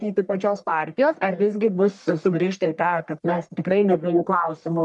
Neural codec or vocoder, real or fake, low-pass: codec, 44.1 kHz, 1.7 kbps, Pupu-Codec; fake; 10.8 kHz